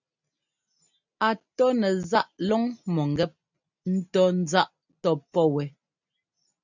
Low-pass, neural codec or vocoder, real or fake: 7.2 kHz; none; real